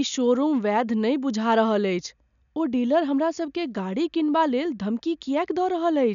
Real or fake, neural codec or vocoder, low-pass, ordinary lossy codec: real; none; 7.2 kHz; none